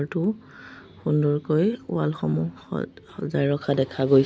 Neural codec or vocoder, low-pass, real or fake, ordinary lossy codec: none; none; real; none